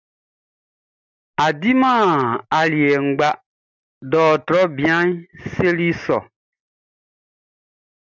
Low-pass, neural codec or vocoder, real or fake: 7.2 kHz; none; real